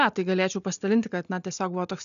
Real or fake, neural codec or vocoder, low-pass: real; none; 7.2 kHz